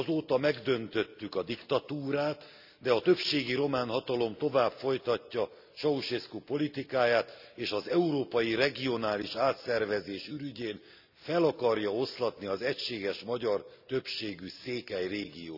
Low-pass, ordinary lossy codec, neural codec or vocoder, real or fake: 5.4 kHz; none; none; real